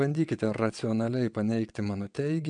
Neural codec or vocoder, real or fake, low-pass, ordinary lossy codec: vocoder, 22.05 kHz, 80 mel bands, WaveNeXt; fake; 9.9 kHz; MP3, 96 kbps